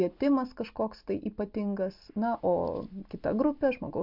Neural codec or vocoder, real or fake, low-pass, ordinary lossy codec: none; real; 5.4 kHz; MP3, 48 kbps